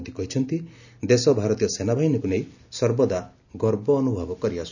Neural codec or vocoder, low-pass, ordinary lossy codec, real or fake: none; 7.2 kHz; none; real